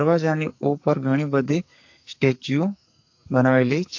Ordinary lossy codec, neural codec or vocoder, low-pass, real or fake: AAC, 48 kbps; codec, 44.1 kHz, 2.6 kbps, SNAC; 7.2 kHz; fake